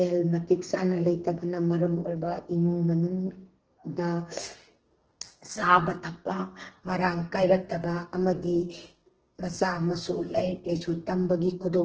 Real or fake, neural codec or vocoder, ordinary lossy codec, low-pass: fake; codec, 32 kHz, 1.9 kbps, SNAC; Opus, 16 kbps; 7.2 kHz